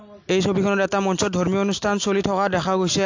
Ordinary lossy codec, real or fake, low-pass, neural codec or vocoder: AAC, 48 kbps; real; 7.2 kHz; none